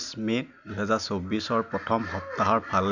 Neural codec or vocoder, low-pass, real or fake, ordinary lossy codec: none; 7.2 kHz; real; none